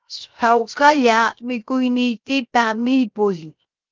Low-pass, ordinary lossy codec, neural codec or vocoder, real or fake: 7.2 kHz; Opus, 32 kbps; codec, 16 kHz, 0.3 kbps, FocalCodec; fake